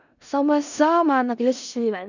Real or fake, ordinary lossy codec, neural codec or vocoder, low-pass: fake; none; codec, 16 kHz in and 24 kHz out, 0.4 kbps, LongCat-Audio-Codec, four codebook decoder; 7.2 kHz